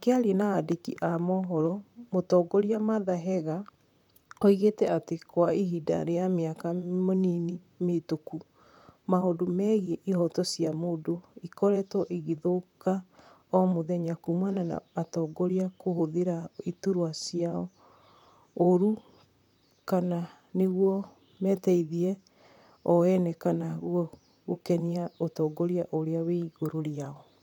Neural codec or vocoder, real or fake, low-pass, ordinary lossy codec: vocoder, 44.1 kHz, 128 mel bands, Pupu-Vocoder; fake; 19.8 kHz; none